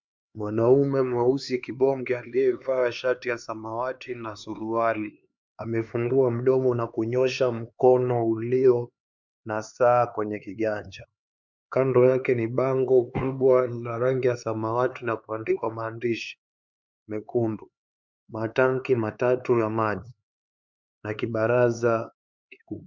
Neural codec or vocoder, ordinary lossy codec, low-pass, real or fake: codec, 16 kHz, 4 kbps, X-Codec, HuBERT features, trained on LibriSpeech; MP3, 64 kbps; 7.2 kHz; fake